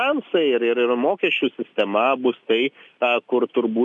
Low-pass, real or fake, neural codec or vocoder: 10.8 kHz; real; none